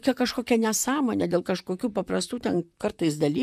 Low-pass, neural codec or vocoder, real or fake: 14.4 kHz; vocoder, 44.1 kHz, 128 mel bands, Pupu-Vocoder; fake